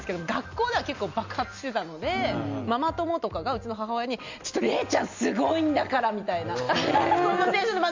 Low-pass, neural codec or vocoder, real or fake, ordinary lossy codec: 7.2 kHz; none; real; MP3, 64 kbps